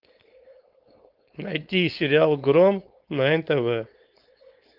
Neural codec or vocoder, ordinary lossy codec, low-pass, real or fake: codec, 16 kHz, 4.8 kbps, FACodec; Opus, 32 kbps; 5.4 kHz; fake